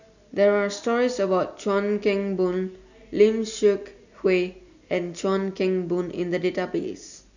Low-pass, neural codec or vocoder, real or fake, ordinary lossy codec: 7.2 kHz; none; real; none